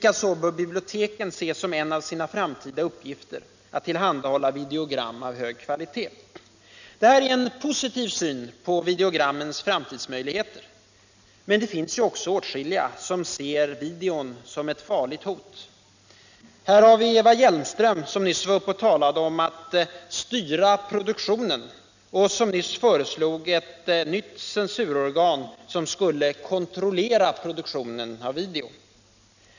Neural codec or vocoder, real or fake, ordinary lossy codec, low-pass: none; real; none; 7.2 kHz